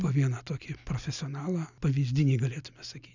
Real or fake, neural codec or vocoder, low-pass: real; none; 7.2 kHz